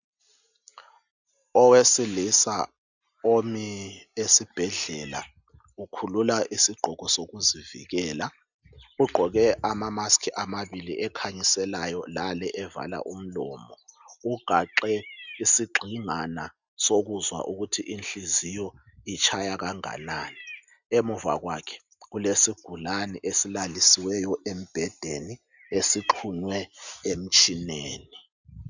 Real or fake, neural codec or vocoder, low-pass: real; none; 7.2 kHz